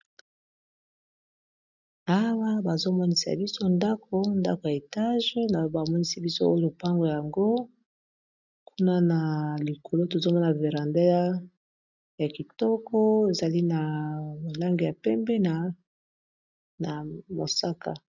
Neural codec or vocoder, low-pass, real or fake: none; 7.2 kHz; real